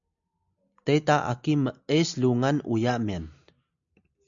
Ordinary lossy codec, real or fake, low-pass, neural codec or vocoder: MP3, 96 kbps; real; 7.2 kHz; none